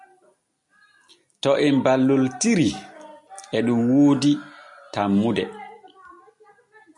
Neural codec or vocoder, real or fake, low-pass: none; real; 10.8 kHz